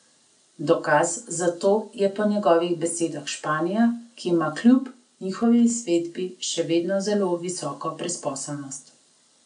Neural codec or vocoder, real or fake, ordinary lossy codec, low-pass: none; real; none; 9.9 kHz